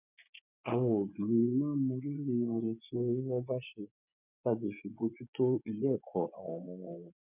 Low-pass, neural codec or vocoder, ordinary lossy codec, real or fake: 3.6 kHz; vocoder, 24 kHz, 100 mel bands, Vocos; none; fake